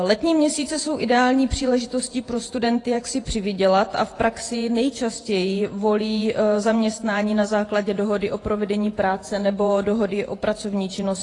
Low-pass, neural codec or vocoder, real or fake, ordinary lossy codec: 10.8 kHz; vocoder, 48 kHz, 128 mel bands, Vocos; fake; AAC, 32 kbps